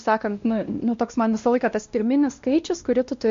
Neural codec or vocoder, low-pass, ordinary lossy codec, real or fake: codec, 16 kHz, 1 kbps, X-Codec, WavLM features, trained on Multilingual LibriSpeech; 7.2 kHz; MP3, 48 kbps; fake